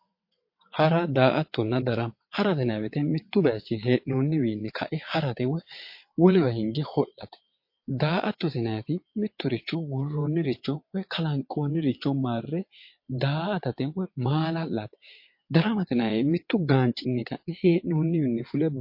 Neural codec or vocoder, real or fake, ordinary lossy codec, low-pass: vocoder, 22.05 kHz, 80 mel bands, WaveNeXt; fake; MP3, 32 kbps; 5.4 kHz